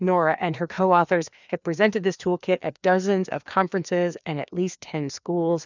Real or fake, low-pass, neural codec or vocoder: fake; 7.2 kHz; codec, 16 kHz, 2 kbps, FreqCodec, larger model